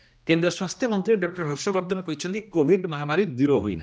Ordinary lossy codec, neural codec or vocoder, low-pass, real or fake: none; codec, 16 kHz, 1 kbps, X-Codec, HuBERT features, trained on general audio; none; fake